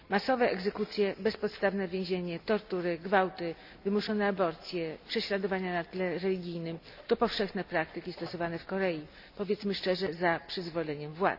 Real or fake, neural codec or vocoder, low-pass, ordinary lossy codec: real; none; 5.4 kHz; none